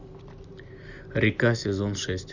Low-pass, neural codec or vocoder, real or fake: 7.2 kHz; none; real